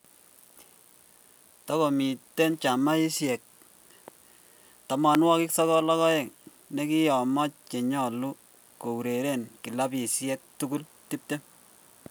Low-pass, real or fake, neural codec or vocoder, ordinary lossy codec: none; real; none; none